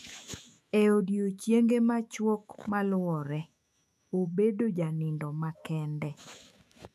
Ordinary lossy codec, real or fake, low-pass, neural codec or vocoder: none; fake; 14.4 kHz; autoencoder, 48 kHz, 128 numbers a frame, DAC-VAE, trained on Japanese speech